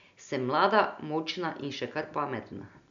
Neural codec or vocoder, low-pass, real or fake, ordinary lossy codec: none; 7.2 kHz; real; MP3, 64 kbps